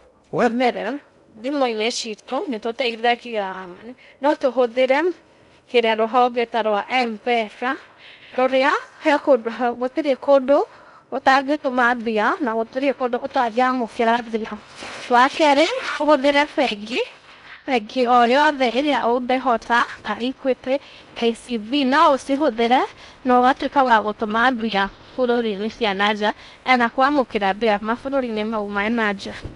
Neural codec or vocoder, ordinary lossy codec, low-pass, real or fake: codec, 16 kHz in and 24 kHz out, 0.6 kbps, FocalCodec, streaming, 2048 codes; none; 10.8 kHz; fake